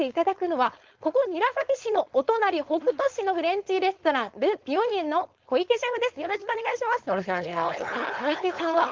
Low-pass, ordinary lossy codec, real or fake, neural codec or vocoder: 7.2 kHz; Opus, 32 kbps; fake; codec, 16 kHz, 4.8 kbps, FACodec